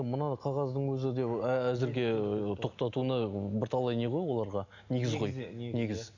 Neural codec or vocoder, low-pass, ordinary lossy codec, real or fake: none; 7.2 kHz; none; real